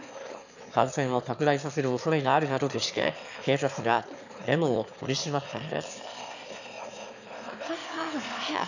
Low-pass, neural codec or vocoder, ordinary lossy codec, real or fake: 7.2 kHz; autoencoder, 22.05 kHz, a latent of 192 numbers a frame, VITS, trained on one speaker; none; fake